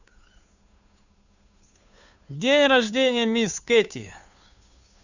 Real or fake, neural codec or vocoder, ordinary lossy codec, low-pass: fake; codec, 16 kHz, 2 kbps, FunCodec, trained on Chinese and English, 25 frames a second; none; 7.2 kHz